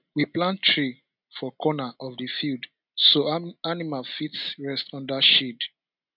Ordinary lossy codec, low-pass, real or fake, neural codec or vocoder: none; 5.4 kHz; real; none